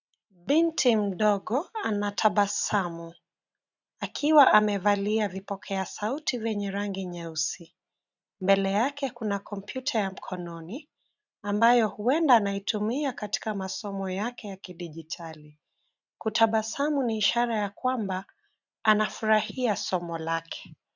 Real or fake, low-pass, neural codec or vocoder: real; 7.2 kHz; none